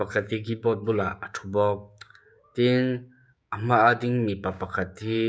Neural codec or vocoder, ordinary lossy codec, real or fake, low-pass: codec, 16 kHz, 6 kbps, DAC; none; fake; none